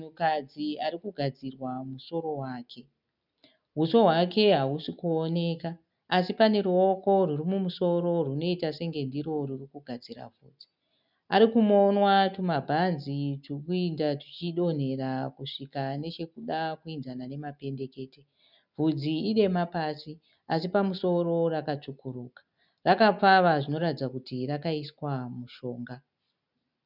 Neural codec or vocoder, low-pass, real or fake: none; 5.4 kHz; real